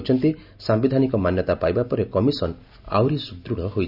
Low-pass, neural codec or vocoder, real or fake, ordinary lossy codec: 5.4 kHz; none; real; none